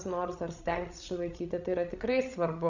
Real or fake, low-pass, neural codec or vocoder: fake; 7.2 kHz; codec, 16 kHz, 16 kbps, FreqCodec, larger model